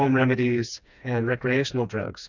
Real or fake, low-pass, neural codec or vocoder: fake; 7.2 kHz; codec, 16 kHz, 2 kbps, FreqCodec, smaller model